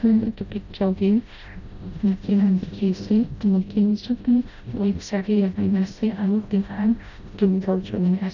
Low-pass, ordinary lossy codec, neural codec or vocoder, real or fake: 7.2 kHz; none; codec, 16 kHz, 0.5 kbps, FreqCodec, smaller model; fake